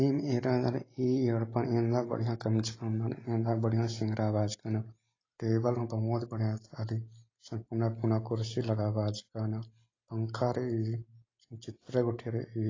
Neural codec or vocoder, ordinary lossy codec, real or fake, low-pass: none; AAC, 32 kbps; real; 7.2 kHz